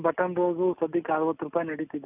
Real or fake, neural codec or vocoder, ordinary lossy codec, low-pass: real; none; none; 3.6 kHz